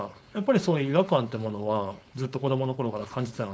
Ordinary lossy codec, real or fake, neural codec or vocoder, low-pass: none; fake; codec, 16 kHz, 4.8 kbps, FACodec; none